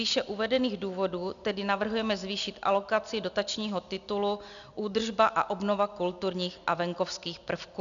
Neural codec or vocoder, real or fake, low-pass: none; real; 7.2 kHz